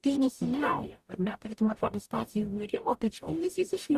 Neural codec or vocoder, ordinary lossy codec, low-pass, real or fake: codec, 44.1 kHz, 0.9 kbps, DAC; Opus, 64 kbps; 14.4 kHz; fake